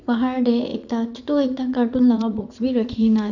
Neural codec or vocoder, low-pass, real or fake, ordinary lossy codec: vocoder, 44.1 kHz, 80 mel bands, Vocos; 7.2 kHz; fake; none